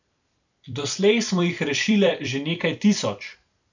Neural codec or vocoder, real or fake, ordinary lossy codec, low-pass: vocoder, 44.1 kHz, 128 mel bands every 256 samples, BigVGAN v2; fake; none; 7.2 kHz